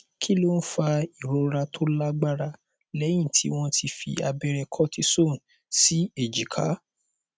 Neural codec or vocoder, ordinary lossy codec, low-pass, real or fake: none; none; none; real